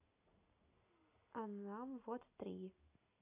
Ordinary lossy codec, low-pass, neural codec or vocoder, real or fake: none; 3.6 kHz; none; real